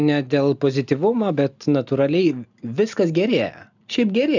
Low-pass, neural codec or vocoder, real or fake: 7.2 kHz; none; real